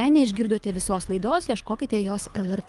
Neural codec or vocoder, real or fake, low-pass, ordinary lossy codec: codec, 24 kHz, 3 kbps, HILCodec; fake; 10.8 kHz; Opus, 32 kbps